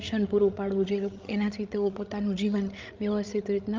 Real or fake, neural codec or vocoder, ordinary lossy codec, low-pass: fake; codec, 16 kHz, 16 kbps, FreqCodec, larger model; Opus, 24 kbps; 7.2 kHz